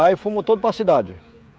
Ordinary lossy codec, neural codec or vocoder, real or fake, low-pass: none; codec, 16 kHz, 16 kbps, FreqCodec, smaller model; fake; none